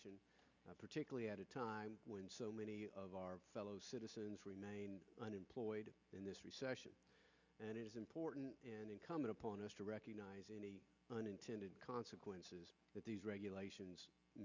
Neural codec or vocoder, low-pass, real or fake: none; 7.2 kHz; real